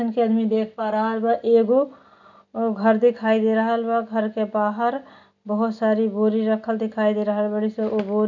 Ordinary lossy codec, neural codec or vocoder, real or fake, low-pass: none; none; real; 7.2 kHz